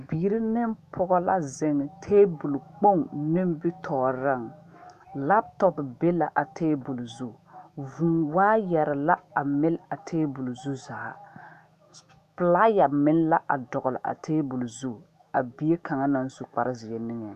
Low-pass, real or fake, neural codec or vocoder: 14.4 kHz; fake; autoencoder, 48 kHz, 128 numbers a frame, DAC-VAE, trained on Japanese speech